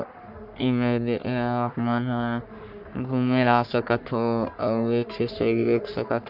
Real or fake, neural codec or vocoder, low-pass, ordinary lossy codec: fake; codec, 44.1 kHz, 3.4 kbps, Pupu-Codec; 5.4 kHz; none